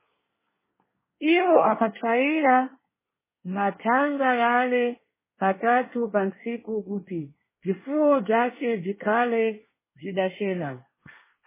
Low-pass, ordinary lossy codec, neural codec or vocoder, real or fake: 3.6 kHz; MP3, 16 kbps; codec, 24 kHz, 1 kbps, SNAC; fake